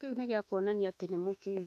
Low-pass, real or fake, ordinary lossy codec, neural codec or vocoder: 14.4 kHz; fake; none; autoencoder, 48 kHz, 32 numbers a frame, DAC-VAE, trained on Japanese speech